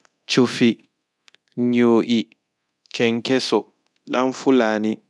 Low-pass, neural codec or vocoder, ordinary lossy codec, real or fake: none; codec, 24 kHz, 0.9 kbps, DualCodec; none; fake